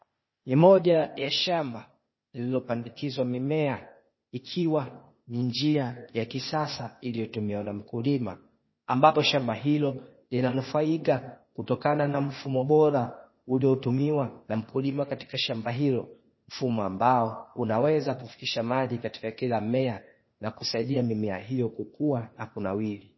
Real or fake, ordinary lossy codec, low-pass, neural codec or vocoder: fake; MP3, 24 kbps; 7.2 kHz; codec, 16 kHz, 0.8 kbps, ZipCodec